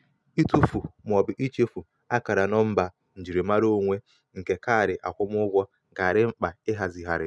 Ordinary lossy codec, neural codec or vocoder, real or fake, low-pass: none; none; real; none